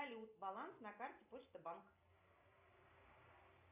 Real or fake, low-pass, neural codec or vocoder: real; 3.6 kHz; none